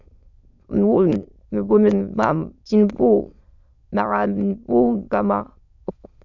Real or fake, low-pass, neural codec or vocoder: fake; 7.2 kHz; autoencoder, 22.05 kHz, a latent of 192 numbers a frame, VITS, trained on many speakers